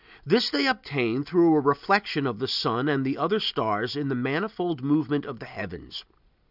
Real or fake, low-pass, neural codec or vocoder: real; 5.4 kHz; none